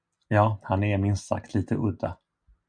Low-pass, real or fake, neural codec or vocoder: 9.9 kHz; real; none